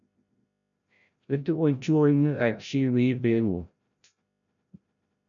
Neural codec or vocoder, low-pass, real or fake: codec, 16 kHz, 0.5 kbps, FreqCodec, larger model; 7.2 kHz; fake